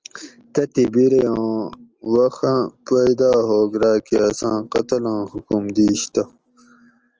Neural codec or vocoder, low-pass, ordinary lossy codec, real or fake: none; 7.2 kHz; Opus, 24 kbps; real